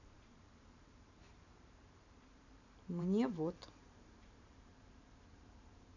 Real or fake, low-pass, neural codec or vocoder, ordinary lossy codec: fake; 7.2 kHz; vocoder, 44.1 kHz, 128 mel bands every 512 samples, BigVGAN v2; none